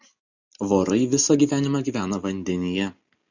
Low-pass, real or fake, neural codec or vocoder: 7.2 kHz; real; none